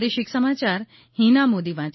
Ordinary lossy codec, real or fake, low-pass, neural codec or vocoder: MP3, 24 kbps; real; 7.2 kHz; none